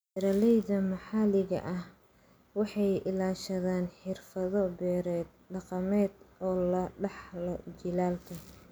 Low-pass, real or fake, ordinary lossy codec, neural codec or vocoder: none; real; none; none